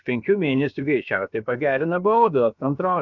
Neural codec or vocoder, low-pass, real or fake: codec, 16 kHz, about 1 kbps, DyCAST, with the encoder's durations; 7.2 kHz; fake